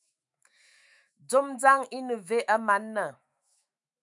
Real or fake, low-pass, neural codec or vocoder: fake; 10.8 kHz; autoencoder, 48 kHz, 128 numbers a frame, DAC-VAE, trained on Japanese speech